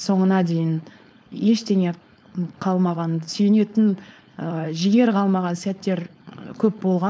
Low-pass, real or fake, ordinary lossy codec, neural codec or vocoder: none; fake; none; codec, 16 kHz, 4.8 kbps, FACodec